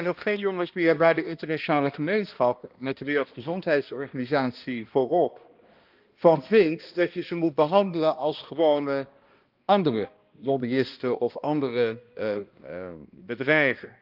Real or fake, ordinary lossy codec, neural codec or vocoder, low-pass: fake; Opus, 32 kbps; codec, 16 kHz, 1 kbps, X-Codec, HuBERT features, trained on balanced general audio; 5.4 kHz